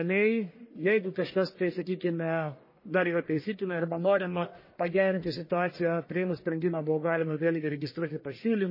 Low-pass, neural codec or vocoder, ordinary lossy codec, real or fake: 5.4 kHz; codec, 44.1 kHz, 1.7 kbps, Pupu-Codec; MP3, 24 kbps; fake